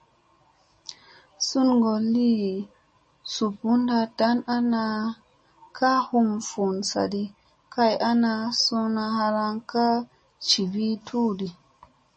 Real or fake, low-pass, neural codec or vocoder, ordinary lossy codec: real; 9.9 kHz; none; MP3, 32 kbps